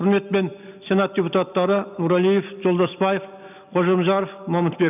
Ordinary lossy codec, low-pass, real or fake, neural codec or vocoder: none; 3.6 kHz; real; none